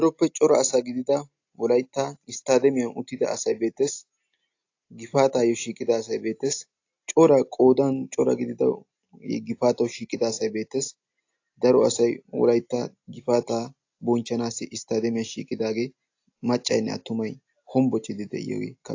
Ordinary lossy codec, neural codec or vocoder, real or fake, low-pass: AAC, 48 kbps; none; real; 7.2 kHz